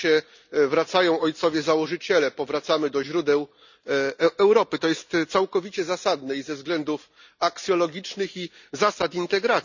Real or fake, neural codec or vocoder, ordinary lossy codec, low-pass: real; none; none; 7.2 kHz